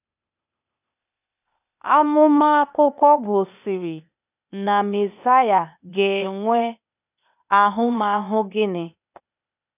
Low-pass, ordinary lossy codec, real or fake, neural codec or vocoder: 3.6 kHz; none; fake; codec, 16 kHz, 0.8 kbps, ZipCodec